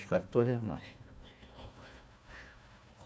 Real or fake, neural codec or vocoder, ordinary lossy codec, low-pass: fake; codec, 16 kHz, 1 kbps, FunCodec, trained on Chinese and English, 50 frames a second; none; none